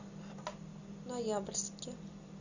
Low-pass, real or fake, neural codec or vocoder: 7.2 kHz; real; none